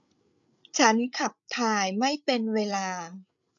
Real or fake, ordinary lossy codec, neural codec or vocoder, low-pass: fake; none; codec, 16 kHz, 8 kbps, FreqCodec, larger model; 7.2 kHz